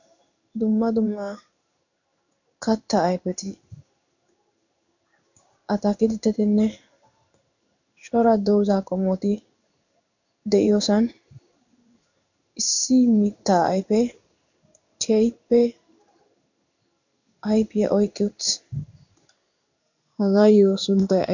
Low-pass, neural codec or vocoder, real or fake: 7.2 kHz; codec, 16 kHz in and 24 kHz out, 1 kbps, XY-Tokenizer; fake